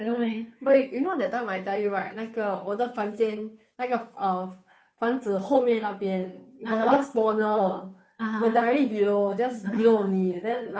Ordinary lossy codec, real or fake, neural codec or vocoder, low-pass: none; fake; codec, 16 kHz, 2 kbps, FunCodec, trained on Chinese and English, 25 frames a second; none